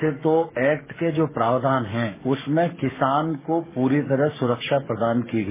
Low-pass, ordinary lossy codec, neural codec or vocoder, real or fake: 3.6 kHz; MP3, 16 kbps; codec, 44.1 kHz, 7.8 kbps, DAC; fake